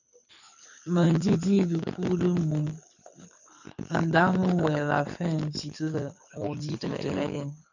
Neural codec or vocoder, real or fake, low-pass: codec, 24 kHz, 6 kbps, HILCodec; fake; 7.2 kHz